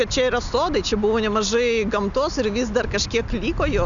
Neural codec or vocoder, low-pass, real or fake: none; 7.2 kHz; real